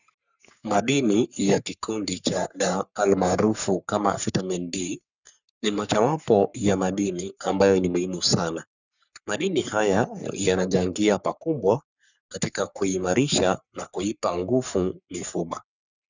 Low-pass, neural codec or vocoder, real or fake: 7.2 kHz; codec, 44.1 kHz, 3.4 kbps, Pupu-Codec; fake